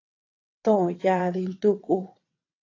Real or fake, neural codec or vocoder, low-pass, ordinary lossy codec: fake; vocoder, 22.05 kHz, 80 mel bands, WaveNeXt; 7.2 kHz; AAC, 32 kbps